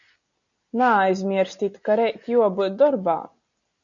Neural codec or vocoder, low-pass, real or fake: none; 7.2 kHz; real